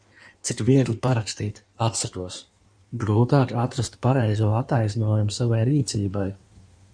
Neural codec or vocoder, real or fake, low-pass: codec, 16 kHz in and 24 kHz out, 1.1 kbps, FireRedTTS-2 codec; fake; 9.9 kHz